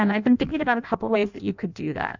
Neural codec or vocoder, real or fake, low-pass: codec, 16 kHz in and 24 kHz out, 0.6 kbps, FireRedTTS-2 codec; fake; 7.2 kHz